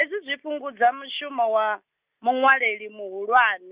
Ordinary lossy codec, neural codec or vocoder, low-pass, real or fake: Opus, 64 kbps; none; 3.6 kHz; real